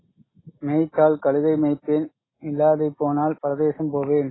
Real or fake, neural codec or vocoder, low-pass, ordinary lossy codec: real; none; 7.2 kHz; AAC, 16 kbps